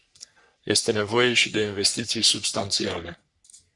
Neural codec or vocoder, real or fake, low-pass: codec, 44.1 kHz, 3.4 kbps, Pupu-Codec; fake; 10.8 kHz